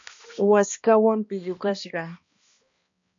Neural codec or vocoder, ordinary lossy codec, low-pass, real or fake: codec, 16 kHz, 1 kbps, X-Codec, HuBERT features, trained on balanced general audio; MP3, 64 kbps; 7.2 kHz; fake